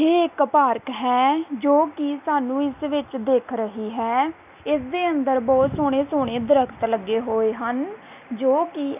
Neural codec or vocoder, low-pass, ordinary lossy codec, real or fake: none; 3.6 kHz; none; real